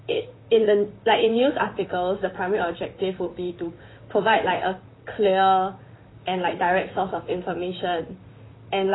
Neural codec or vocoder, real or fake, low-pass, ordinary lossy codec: vocoder, 44.1 kHz, 128 mel bands, Pupu-Vocoder; fake; 7.2 kHz; AAC, 16 kbps